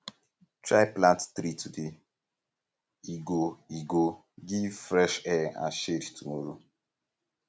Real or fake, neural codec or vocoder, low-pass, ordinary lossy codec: real; none; none; none